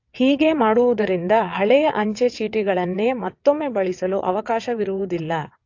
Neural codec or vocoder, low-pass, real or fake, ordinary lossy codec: vocoder, 22.05 kHz, 80 mel bands, WaveNeXt; 7.2 kHz; fake; AAC, 48 kbps